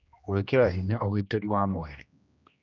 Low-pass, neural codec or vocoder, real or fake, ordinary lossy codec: 7.2 kHz; codec, 16 kHz, 1 kbps, X-Codec, HuBERT features, trained on general audio; fake; none